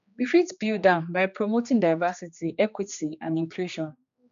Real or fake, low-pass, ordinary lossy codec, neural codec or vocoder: fake; 7.2 kHz; MP3, 64 kbps; codec, 16 kHz, 2 kbps, X-Codec, HuBERT features, trained on general audio